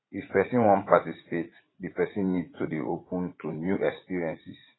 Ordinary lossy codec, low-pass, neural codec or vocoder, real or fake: AAC, 16 kbps; 7.2 kHz; vocoder, 44.1 kHz, 80 mel bands, Vocos; fake